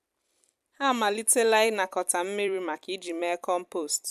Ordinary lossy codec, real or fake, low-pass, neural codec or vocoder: MP3, 96 kbps; fake; 14.4 kHz; vocoder, 44.1 kHz, 128 mel bands every 256 samples, BigVGAN v2